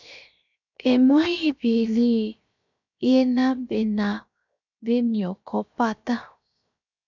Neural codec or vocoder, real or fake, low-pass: codec, 16 kHz, 0.7 kbps, FocalCodec; fake; 7.2 kHz